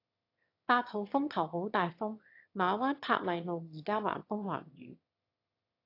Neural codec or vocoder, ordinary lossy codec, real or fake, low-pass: autoencoder, 22.05 kHz, a latent of 192 numbers a frame, VITS, trained on one speaker; AAC, 32 kbps; fake; 5.4 kHz